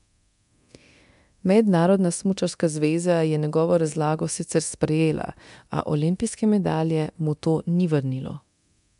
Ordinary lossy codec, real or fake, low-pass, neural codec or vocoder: none; fake; 10.8 kHz; codec, 24 kHz, 0.9 kbps, DualCodec